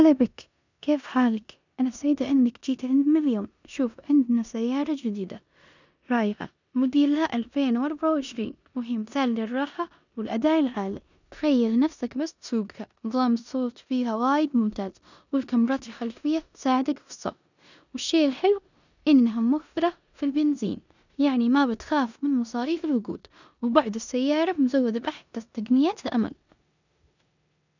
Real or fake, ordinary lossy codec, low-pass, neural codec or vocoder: fake; none; 7.2 kHz; codec, 16 kHz in and 24 kHz out, 0.9 kbps, LongCat-Audio-Codec, four codebook decoder